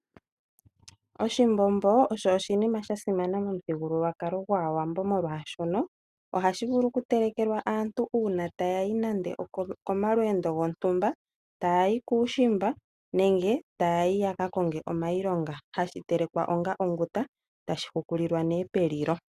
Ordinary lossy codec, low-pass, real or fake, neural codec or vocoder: Opus, 64 kbps; 14.4 kHz; real; none